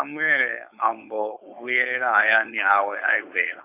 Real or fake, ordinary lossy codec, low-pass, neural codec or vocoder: fake; AAC, 24 kbps; 3.6 kHz; codec, 16 kHz, 4.8 kbps, FACodec